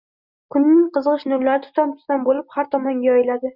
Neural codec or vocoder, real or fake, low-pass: none; real; 5.4 kHz